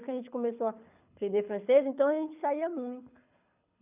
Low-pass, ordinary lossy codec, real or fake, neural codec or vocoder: 3.6 kHz; none; fake; codec, 24 kHz, 6 kbps, HILCodec